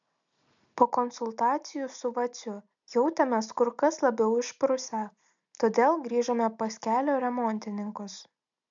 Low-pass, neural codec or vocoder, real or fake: 7.2 kHz; none; real